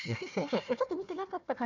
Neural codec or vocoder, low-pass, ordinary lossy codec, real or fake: codec, 16 kHz, 1 kbps, FunCodec, trained on Chinese and English, 50 frames a second; 7.2 kHz; none; fake